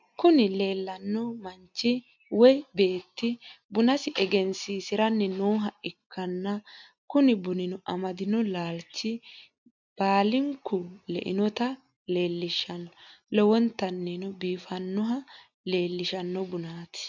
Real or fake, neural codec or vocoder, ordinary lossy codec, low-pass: real; none; MP3, 64 kbps; 7.2 kHz